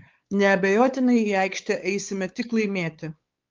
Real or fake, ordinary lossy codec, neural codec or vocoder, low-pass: fake; Opus, 32 kbps; codec, 16 kHz, 4 kbps, X-Codec, WavLM features, trained on Multilingual LibriSpeech; 7.2 kHz